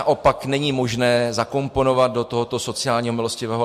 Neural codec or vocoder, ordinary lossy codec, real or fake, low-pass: none; MP3, 64 kbps; real; 14.4 kHz